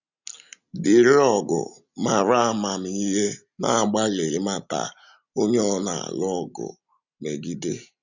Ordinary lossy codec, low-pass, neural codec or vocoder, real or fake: none; 7.2 kHz; none; real